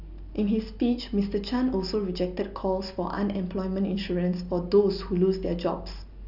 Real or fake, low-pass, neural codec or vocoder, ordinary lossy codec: real; 5.4 kHz; none; none